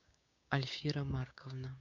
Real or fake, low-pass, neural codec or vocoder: fake; 7.2 kHz; codec, 16 kHz, 6 kbps, DAC